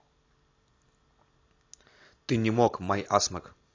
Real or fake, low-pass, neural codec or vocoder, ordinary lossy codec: real; 7.2 kHz; none; AAC, 32 kbps